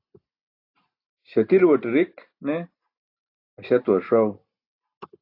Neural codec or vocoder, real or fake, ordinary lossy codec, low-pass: none; real; AAC, 32 kbps; 5.4 kHz